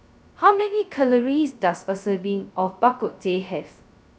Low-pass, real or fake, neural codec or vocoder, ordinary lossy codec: none; fake; codec, 16 kHz, 0.2 kbps, FocalCodec; none